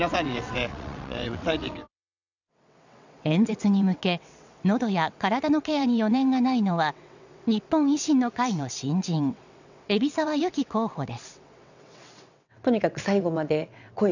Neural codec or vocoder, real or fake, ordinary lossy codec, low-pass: vocoder, 22.05 kHz, 80 mel bands, WaveNeXt; fake; none; 7.2 kHz